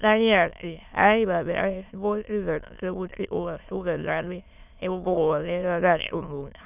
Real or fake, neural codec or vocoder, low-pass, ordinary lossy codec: fake; autoencoder, 22.05 kHz, a latent of 192 numbers a frame, VITS, trained on many speakers; 3.6 kHz; none